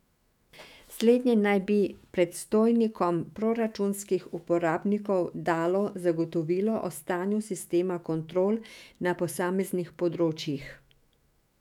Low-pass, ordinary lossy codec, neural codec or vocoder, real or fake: 19.8 kHz; none; autoencoder, 48 kHz, 128 numbers a frame, DAC-VAE, trained on Japanese speech; fake